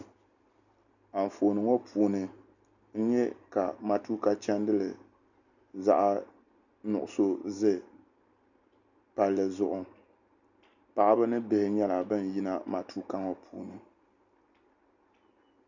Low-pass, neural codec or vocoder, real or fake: 7.2 kHz; none; real